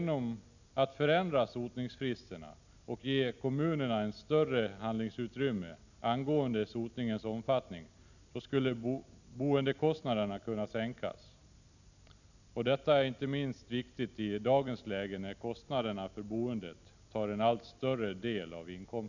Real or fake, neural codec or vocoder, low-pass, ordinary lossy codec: real; none; 7.2 kHz; none